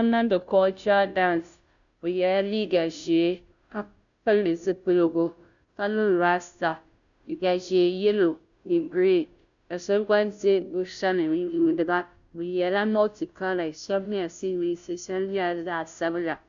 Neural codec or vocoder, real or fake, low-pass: codec, 16 kHz, 0.5 kbps, FunCodec, trained on Chinese and English, 25 frames a second; fake; 7.2 kHz